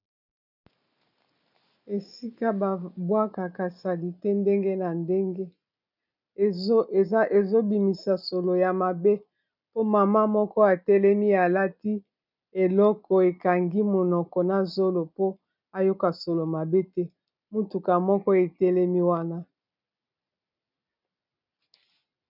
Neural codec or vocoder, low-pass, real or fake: none; 5.4 kHz; real